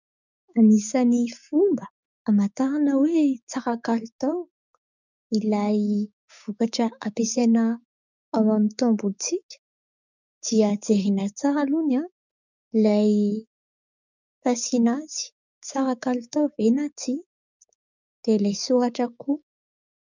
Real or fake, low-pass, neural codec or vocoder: fake; 7.2 kHz; codec, 16 kHz, 6 kbps, DAC